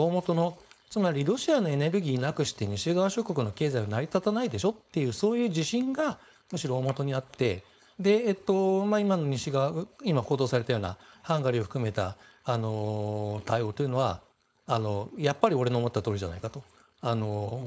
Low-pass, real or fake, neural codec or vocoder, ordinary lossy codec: none; fake; codec, 16 kHz, 4.8 kbps, FACodec; none